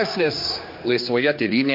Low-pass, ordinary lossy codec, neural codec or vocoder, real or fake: 5.4 kHz; MP3, 32 kbps; codec, 16 kHz, 2 kbps, X-Codec, HuBERT features, trained on general audio; fake